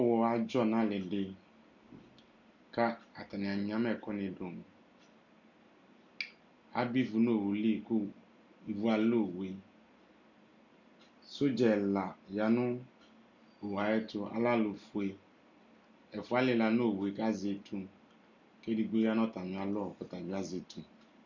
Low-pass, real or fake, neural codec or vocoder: 7.2 kHz; real; none